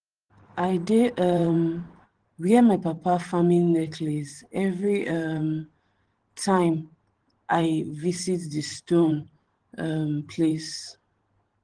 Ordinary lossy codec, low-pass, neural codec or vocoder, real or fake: Opus, 16 kbps; 9.9 kHz; vocoder, 44.1 kHz, 128 mel bands every 512 samples, BigVGAN v2; fake